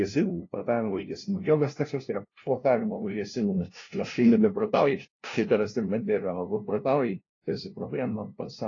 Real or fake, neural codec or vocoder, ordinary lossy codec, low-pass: fake; codec, 16 kHz, 0.5 kbps, FunCodec, trained on LibriTTS, 25 frames a second; AAC, 32 kbps; 7.2 kHz